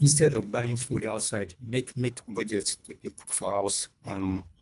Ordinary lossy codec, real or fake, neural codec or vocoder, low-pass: none; fake; codec, 24 kHz, 1.5 kbps, HILCodec; 10.8 kHz